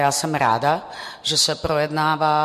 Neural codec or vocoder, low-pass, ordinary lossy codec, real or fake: none; 14.4 kHz; MP3, 64 kbps; real